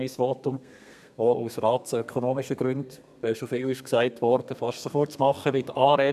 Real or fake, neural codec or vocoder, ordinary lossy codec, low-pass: fake; codec, 32 kHz, 1.9 kbps, SNAC; none; 14.4 kHz